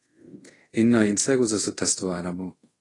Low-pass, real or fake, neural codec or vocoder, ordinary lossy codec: 10.8 kHz; fake; codec, 24 kHz, 0.5 kbps, DualCodec; AAC, 32 kbps